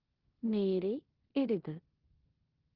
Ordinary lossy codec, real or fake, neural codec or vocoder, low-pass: Opus, 16 kbps; fake; codec, 16 kHz in and 24 kHz out, 0.9 kbps, LongCat-Audio-Codec, four codebook decoder; 5.4 kHz